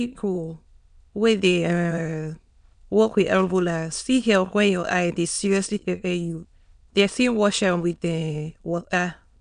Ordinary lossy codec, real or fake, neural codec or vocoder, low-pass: none; fake; autoencoder, 22.05 kHz, a latent of 192 numbers a frame, VITS, trained on many speakers; 9.9 kHz